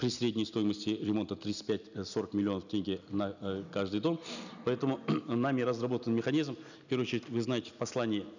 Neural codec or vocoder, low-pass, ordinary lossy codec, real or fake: none; 7.2 kHz; none; real